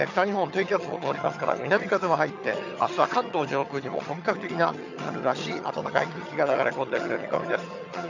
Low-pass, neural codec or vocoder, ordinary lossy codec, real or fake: 7.2 kHz; vocoder, 22.05 kHz, 80 mel bands, HiFi-GAN; none; fake